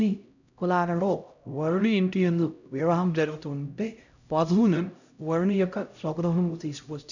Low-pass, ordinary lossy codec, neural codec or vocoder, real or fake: 7.2 kHz; none; codec, 16 kHz, 0.5 kbps, X-Codec, HuBERT features, trained on LibriSpeech; fake